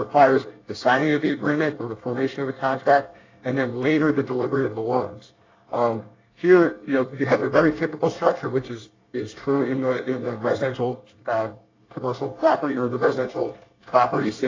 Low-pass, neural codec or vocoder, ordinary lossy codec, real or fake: 7.2 kHz; codec, 24 kHz, 1 kbps, SNAC; AAC, 32 kbps; fake